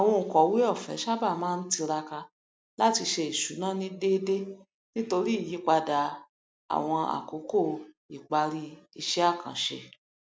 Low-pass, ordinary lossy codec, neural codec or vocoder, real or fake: none; none; none; real